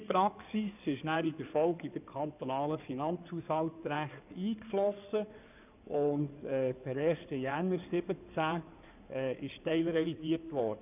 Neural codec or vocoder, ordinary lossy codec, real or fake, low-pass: codec, 16 kHz in and 24 kHz out, 2.2 kbps, FireRedTTS-2 codec; none; fake; 3.6 kHz